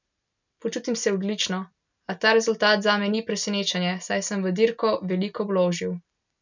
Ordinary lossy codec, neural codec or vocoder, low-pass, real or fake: none; none; 7.2 kHz; real